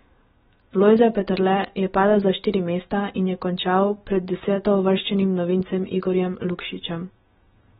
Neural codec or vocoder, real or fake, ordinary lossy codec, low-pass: autoencoder, 48 kHz, 128 numbers a frame, DAC-VAE, trained on Japanese speech; fake; AAC, 16 kbps; 19.8 kHz